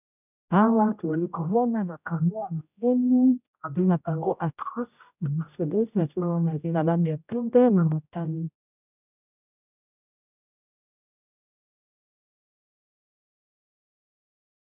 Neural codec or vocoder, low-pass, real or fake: codec, 16 kHz, 0.5 kbps, X-Codec, HuBERT features, trained on general audio; 3.6 kHz; fake